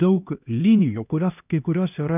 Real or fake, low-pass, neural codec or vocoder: fake; 3.6 kHz; codec, 16 kHz, 1 kbps, X-Codec, HuBERT features, trained on LibriSpeech